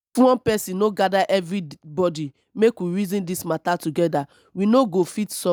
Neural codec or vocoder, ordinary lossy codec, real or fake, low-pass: none; none; real; none